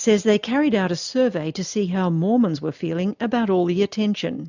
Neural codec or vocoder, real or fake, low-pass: none; real; 7.2 kHz